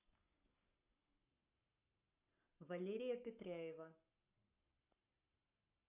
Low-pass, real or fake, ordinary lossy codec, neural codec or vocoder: 3.6 kHz; fake; none; codec, 44.1 kHz, 7.8 kbps, Pupu-Codec